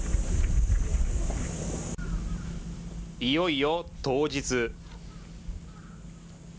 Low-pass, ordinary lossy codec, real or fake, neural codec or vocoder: none; none; real; none